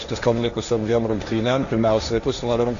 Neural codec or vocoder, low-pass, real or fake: codec, 16 kHz, 1.1 kbps, Voila-Tokenizer; 7.2 kHz; fake